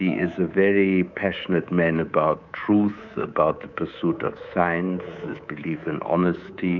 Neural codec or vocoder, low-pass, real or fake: codec, 24 kHz, 3.1 kbps, DualCodec; 7.2 kHz; fake